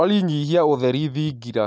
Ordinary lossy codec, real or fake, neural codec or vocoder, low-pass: none; real; none; none